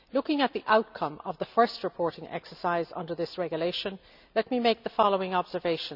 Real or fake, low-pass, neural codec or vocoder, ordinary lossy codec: real; 5.4 kHz; none; none